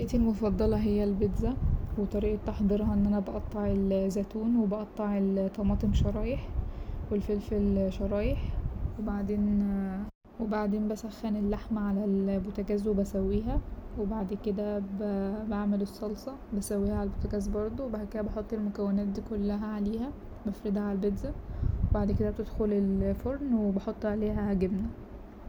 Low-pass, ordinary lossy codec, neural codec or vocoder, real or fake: 19.8 kHz; none; none; real